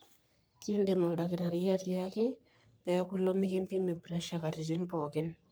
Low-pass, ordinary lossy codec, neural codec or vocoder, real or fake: none; none; codec, 44.1 kHz, 3.4 kbps, Pupu-Codec; fake